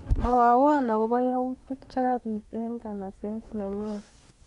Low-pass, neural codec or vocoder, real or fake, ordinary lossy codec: 10.8 kHz; codec, 24 kHz, 1 kbps, SNAC; fake; none